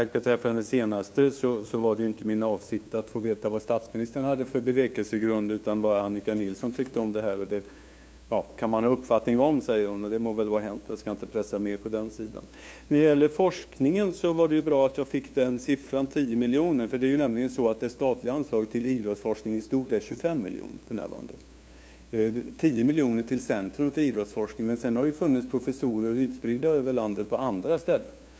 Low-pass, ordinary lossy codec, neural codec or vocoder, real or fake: none; none; codec, 16 kHz, 2 kbps, FunCodec, trained on LibriTTS, 25 frames a second; fake